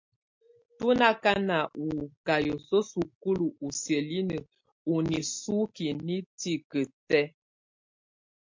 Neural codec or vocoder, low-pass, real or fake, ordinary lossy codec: none; 7.2 kHz; real; MP3, 48 kbps